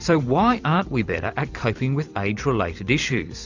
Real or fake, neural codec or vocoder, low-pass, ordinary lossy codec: fake; vocoder, 44.1 kHz, 128 mel bands every 256 samples, BigVGAN v2; 7.2 kHz; Opus, 64 kbps